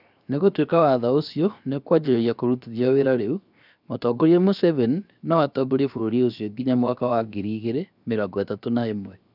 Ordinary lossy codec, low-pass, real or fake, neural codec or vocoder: none; 5.4 kHz; fake; codec, 16 kHz, 0.7 kbps, FocalCodec